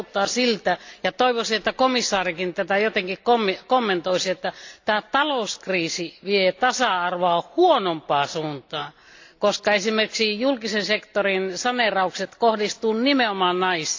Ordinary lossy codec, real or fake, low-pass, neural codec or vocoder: AAC, 48 kbps; real; 7.2 kHz; none